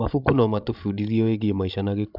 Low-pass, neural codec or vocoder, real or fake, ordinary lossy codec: 5.4 kHz; codec, 16 kHz, 16 kbps, FreqCodec, larger model; fake; none